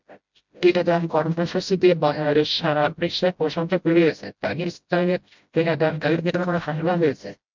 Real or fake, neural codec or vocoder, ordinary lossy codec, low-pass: fake; codec, 16 kHz, 0.5 kbps, FreqCodec, smaller model; MP3, 64 kbps; 7.2 kHz